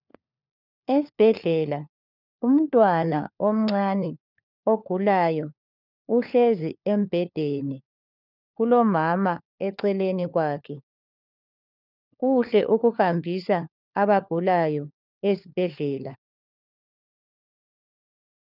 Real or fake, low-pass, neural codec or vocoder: fake; 5.4 kHz; codec, 16 kHz, 4 kbps, FunCodec, trained on LibriTTS, 50 frames a second